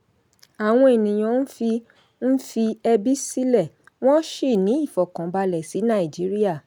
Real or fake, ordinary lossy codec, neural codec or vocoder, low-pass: real; none; none; none